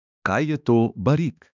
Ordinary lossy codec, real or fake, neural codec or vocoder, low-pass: none; fake; codec, 16 kHz, 2 kbps, X-Codec, HuBERT features, trained on balanced general audio; 7.2 kHz